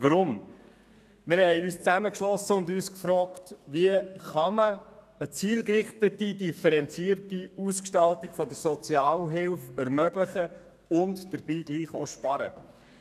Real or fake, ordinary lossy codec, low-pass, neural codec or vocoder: fake; none; 14.4 kHz; codec, 32 kHz, 1.9 kbps, SNAC